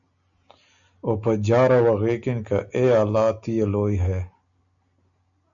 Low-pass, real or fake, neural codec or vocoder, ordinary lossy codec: 7.2 kHz; real; none; MP3, 96 kbps